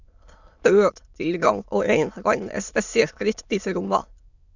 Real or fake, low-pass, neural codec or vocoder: fake; 7.2 kHz; autoencoder, 22.05 kHz, a latent of 192 numbers a frame, VITS, trained on many speakers